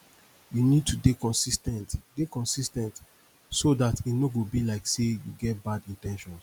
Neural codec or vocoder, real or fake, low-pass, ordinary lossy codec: none; real; none; none